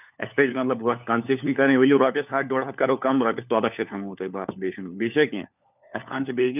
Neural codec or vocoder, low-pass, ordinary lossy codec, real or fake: codec, 16 kHz, 4 kbps, FunCodec, trained on LibriTTS, 50 frames a second; 3.6 kHz; none; fake